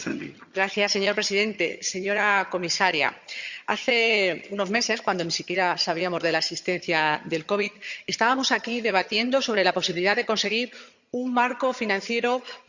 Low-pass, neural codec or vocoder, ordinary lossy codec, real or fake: 7.2 kHz; vocoder, 22.05 kHz, 80 mel bands, HiFi-GAN; Opus, 64 kbps; fake